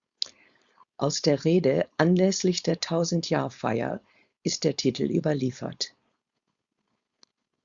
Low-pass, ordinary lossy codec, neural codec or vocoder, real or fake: 7.2 kHz; Opus, 64 kbps; codec, 16 kHz, 4.8 kbps, FACodec; fake